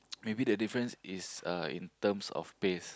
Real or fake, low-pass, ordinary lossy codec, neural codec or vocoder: real; none; none; none